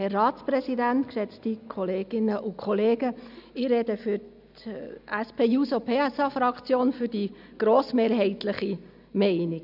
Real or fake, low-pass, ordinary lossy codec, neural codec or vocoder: real; 5.4 kHz; none; none